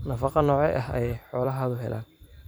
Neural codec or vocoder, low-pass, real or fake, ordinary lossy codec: vocoder, 44.1 kHz, 128 mel bands every 256 samples, BigVGAN v2; none; fake; none